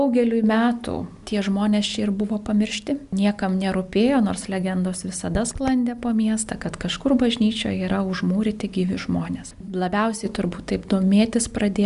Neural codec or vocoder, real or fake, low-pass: none; real; 10.8 kHz